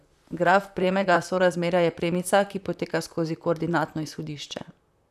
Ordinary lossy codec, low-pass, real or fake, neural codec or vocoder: none; 14.4 kHz; fake; vocoder, 44.1 kHz, 128 mel bands, Pupu-Vocoder